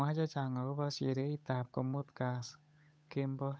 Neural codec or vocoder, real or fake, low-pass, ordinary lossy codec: codec, 16 kHz, 8 kbps, FunCodec, trained on Chinese and English, 25 frames a second; fake; none; none